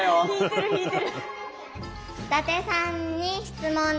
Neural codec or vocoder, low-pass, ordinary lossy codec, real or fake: none; none; none; real